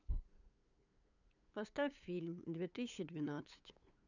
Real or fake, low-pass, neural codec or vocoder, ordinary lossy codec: fake; 7.2 kHz; codec, 16 kHz, 8 kbps, FunCodec, trained on LibriTTS, 25 frames a second; none